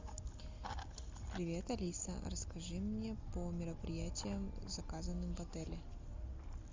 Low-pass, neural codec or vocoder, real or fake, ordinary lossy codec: 7.2 kHz; none; real; MP3, 64 kbps